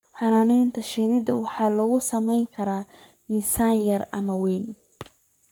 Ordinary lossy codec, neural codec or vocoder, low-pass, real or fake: none; codec, 44.1 kHz, 3.4 kbps, Pupu-Codec; none; fake